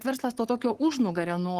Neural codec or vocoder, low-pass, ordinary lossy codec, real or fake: codec, 44.1 kHz, 7.8 kbps, Pupu-Codec; 14.4 kHz; Opus, 16 kbps; fake